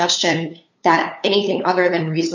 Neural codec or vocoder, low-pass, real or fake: codec, 16 kHz, 2 kbps, FunCodec, trained on LibriTTS, 25 frames a second; 7.2 kHz; fake